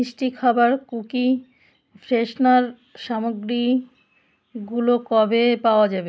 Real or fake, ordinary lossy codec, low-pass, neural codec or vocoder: real; none; none; none